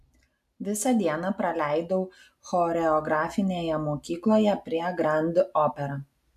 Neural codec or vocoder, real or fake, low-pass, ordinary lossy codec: none; real; 14.4 kHz; AAC, 96 kbps